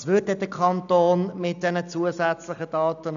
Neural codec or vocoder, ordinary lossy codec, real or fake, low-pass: none; none; real; 7.2 kHz